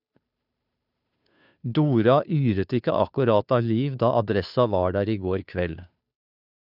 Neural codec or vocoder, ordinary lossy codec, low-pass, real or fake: codec, 16 kHz, 2 kbps, FunCodec, trained on Chinese and English, 25 frames a second; none; 5.4 kHz; fake